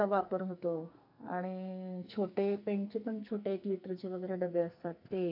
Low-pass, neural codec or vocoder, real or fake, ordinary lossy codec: 5.4 kHz; codec, 44.1 kHz, 2.6 kbps, SNAC; fake; none